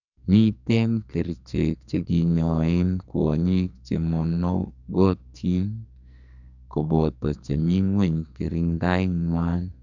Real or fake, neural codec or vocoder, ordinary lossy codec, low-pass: fake; codec, 44.1 kHz, 2.6 kbps, SNAC; none; 7.2 kHz